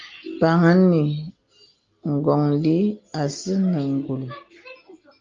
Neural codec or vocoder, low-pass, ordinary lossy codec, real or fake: none; 7.2 kHz; Opus, 32 kbps; real